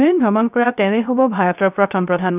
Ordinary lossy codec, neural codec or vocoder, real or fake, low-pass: none; codec, 16 kHz, 0.3 kbps, FocalCodec; fake; 3.6 kHz